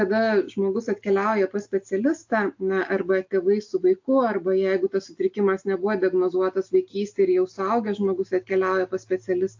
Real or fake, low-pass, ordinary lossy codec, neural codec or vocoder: real; 7.2 kHz; AAC, 48 kbps; none